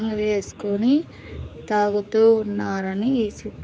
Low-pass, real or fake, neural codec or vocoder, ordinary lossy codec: none; fake; codec, 16 kHz, 4 kbps, X-Codec, HuBERT features, trained on general audio; none